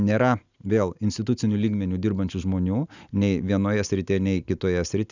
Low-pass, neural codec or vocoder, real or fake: 7.2 kHz; none; real